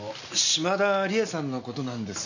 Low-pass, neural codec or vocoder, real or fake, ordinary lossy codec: 7.2 kHz; none; real; none